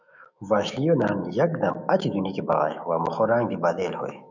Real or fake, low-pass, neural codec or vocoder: fake; 7.2 kHz; autoencoder, 48 kHz, 128 numbers a frame, DAC-VAE, trained on Japanese speech